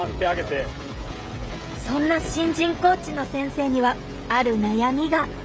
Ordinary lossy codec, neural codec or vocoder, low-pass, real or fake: none; codec, 16 kHz, 16 kbps, FreqCodec, smaller model; none; fake